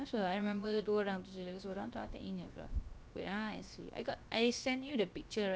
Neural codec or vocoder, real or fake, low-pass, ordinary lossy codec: codec, 16 kHz, 0.7 kbps, FocalCodec; fake; none; none